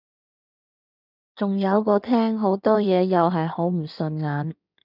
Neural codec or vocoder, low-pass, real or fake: codec, 16 kHz in and 24 kHz out, 2.2 kbps, FireRedTTS-2 codec; 5.4 kHz; fake